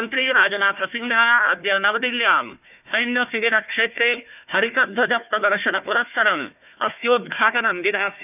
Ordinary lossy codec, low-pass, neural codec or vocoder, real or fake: none; 3.6 kHz; codec, 16 kHz, 1 kbps, FunCodec, trained on Chinese and English, 50 frames a second; fake